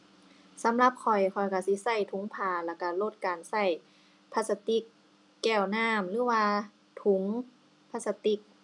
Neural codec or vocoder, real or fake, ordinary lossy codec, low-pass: none; real; none; 10.8 kHz